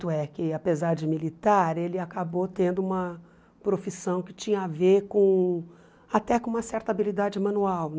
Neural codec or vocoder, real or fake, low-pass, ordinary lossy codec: none; real; none; none